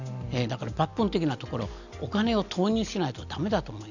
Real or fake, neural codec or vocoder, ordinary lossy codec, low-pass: real; none; none; 7.2 kHz